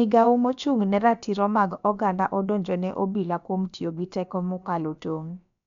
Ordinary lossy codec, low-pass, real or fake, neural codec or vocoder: none; 7.2 kHz; fake; codec, 16 kHz, about 1 kbps, DyCAST, with the encoder's durations